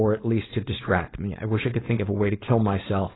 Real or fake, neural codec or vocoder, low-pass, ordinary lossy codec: fake; codec, 16 kHz, 4.8 kbps, FACodec; 7.2 kHz; AAC, 16 kbps